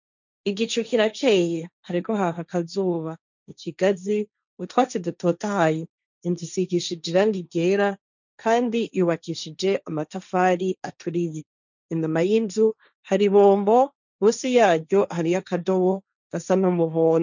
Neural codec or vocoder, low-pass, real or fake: codec, 16 kHz, 1.1 kbps, Voila-Tokenizer; 7.2 kHz; fake